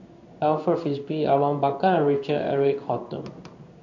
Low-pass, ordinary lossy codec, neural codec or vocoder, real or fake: 7.2 kHz; AAC, 48 kbps; codec, 16 kHz in and 24 kHz out, 1 kbps, XY-Tokenizer; fake